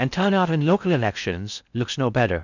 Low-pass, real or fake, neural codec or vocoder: 7.2 kHz; fake; codec, 16 kHz in and 24 kHz out, 0.6 kbps, FocalCodec, streaming, 4096 codes